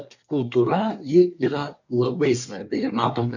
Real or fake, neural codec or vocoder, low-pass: fake; codec, 24 kHz, 1 kbps, SNAC; 7.2 kHz